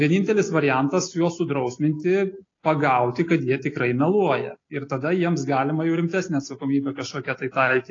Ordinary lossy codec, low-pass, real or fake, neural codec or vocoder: AAC, 32 kbps; 7.2 kHz; real; none